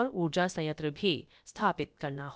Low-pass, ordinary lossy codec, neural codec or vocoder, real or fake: none; none; codec, 16 kHz, about 1 kbps, DyCAST, with the encoder's durations; fake